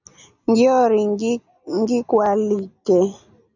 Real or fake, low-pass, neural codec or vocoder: real; 7.2 kHz; none